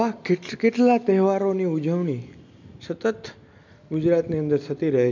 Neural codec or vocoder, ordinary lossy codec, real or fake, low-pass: none; MP3, 64 kbps; real; 7.2 kHz